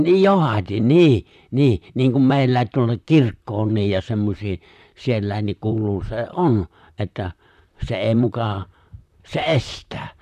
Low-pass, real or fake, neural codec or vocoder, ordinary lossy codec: 14.4 kHz; fake; vocoder, 44.1 kHz, 128 mel bands, Pupu-Vocoder; none